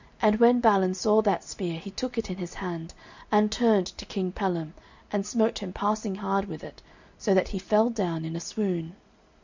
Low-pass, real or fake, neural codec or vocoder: 7.2 kHz; real; none